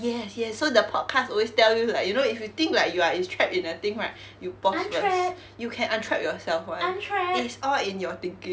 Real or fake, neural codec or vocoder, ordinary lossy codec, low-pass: real; none; none; none